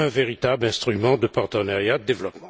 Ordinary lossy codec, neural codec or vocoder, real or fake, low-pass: none; none; real; none